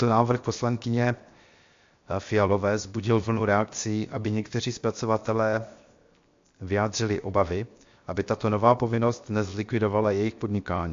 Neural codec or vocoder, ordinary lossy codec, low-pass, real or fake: codec, 16 kHz, 0.7 kbps, FocalCodec; MP3, 48 kbps; 7.2 kHz; fake